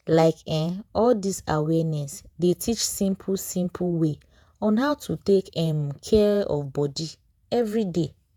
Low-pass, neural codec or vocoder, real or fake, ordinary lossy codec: 19.8 kHz; vocoder, 48 kHz, 128 mel bands, Vocos; fake; none